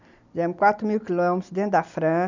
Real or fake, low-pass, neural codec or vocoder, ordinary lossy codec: real; 7.2 kHz; none; none